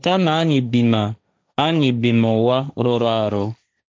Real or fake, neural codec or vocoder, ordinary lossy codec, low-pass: fake; codec, 16 kHz, 1.1 kbps, Voila-Tokenizer; none; none